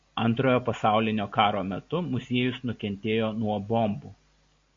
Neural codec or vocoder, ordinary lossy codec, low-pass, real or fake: none; MP3, 48 kbps; 7.2 kHz; real